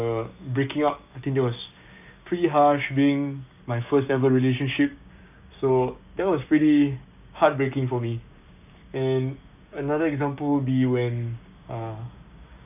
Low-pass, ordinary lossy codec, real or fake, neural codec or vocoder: 3.6 kHz; none; fake; codec, 16 kHz, 6 kbps, DAC